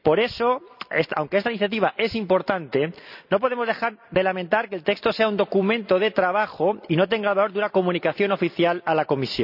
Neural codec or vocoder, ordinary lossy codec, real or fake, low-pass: none; none; real; 5.4 kHz